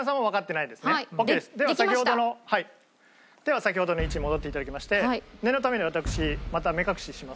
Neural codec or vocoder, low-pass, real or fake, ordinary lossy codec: none; none; real; none